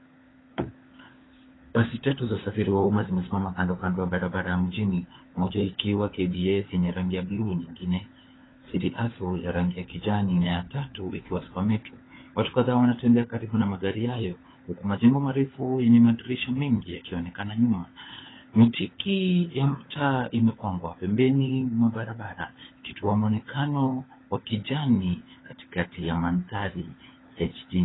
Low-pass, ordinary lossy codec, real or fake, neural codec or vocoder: 7.2 kHz; AAC, 16 kbps; fake; codec, 16 kHz, 4 kbps, FunCodec, trained on LibriTTS, 50 frames a second